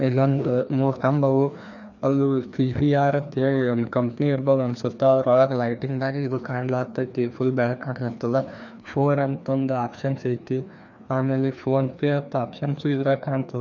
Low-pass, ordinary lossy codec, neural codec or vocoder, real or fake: 7.2 kHz; none; codec, 16 kHz, 2 kbps, FreqCodec, larger model; fake